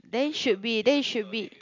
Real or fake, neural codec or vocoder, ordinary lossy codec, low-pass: real; none; MP3, 64 kbps; 7.2 kHz